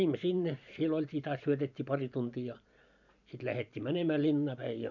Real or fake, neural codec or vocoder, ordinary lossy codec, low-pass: fake; vocoder, 44.1 kHz, 128 mel bands every 512 samples, BigVGAN v2; none; 7.2 kHz